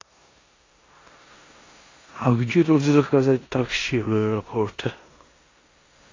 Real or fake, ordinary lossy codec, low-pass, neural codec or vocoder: fake; AAC, 32 kbps; 7.2 kHz; codec, 16 kHz in and 24 kHz out, 0.9 kbps, LongCat-Audio-Codec, four codebook decoder